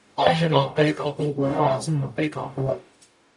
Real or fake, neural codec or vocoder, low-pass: fake; codec, 44.1 kHz, 0.9 kbps, DAC; 10.8 kHz